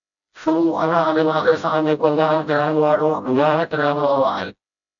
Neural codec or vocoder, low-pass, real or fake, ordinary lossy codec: codec, 16 kHz, 0.5 kbps, FreqCodec, smaller model; 7.2 kHz; fake; MP3, 96 kbps